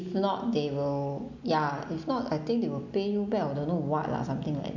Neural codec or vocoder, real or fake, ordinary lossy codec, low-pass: none; real; none; 7.2 kHz